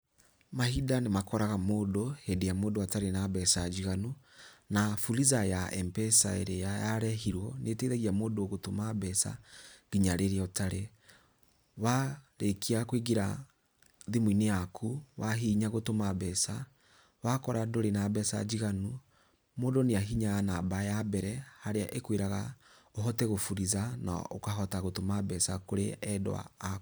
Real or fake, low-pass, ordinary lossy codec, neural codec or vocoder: real; none; none; none